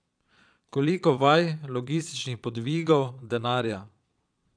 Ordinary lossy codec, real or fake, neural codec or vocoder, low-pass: none; fake; vocoder, 22.05 kHz, 80 mel bands, Vocos; 9.9 kHz